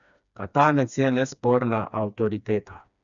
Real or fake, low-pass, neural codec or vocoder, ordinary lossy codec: fake; 7.2 kHz; codec, 16 kHz, 2 kbps, FreqCodec, smaller model; none